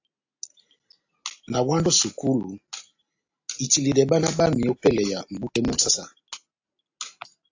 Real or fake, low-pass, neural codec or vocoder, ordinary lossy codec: real; 7.2 kHz; none; AAC, 48 kbps